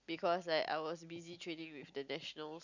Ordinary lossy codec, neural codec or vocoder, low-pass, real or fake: none; none; 7.2 kHz; real